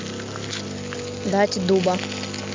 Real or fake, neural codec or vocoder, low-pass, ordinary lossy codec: real; none; 7.2 kHz; MP3, 48 kbps